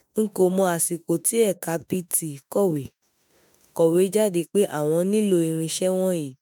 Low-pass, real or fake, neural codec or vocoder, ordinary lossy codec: none; fake; autoencoder, 48 kHz, 32 numbers a frame, DAC-VAE, trained on Japanese speech; none